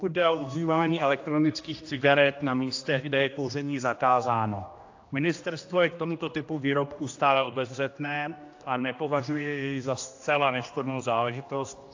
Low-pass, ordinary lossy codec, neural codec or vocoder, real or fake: 7.2 kHz; AAC, 48 kbps; codec, 16 kHz, 1 kbps, X-Codec, HuBERT features, trained on general audio; fake